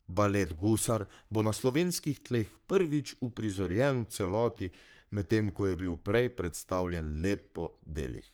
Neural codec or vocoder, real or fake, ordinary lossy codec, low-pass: codec, 44.1 kHz, 3.4 kbps, Pupu-Codec; fake; none; none